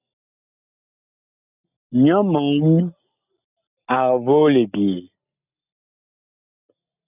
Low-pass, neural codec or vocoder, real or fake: 3.6 kHz; none; real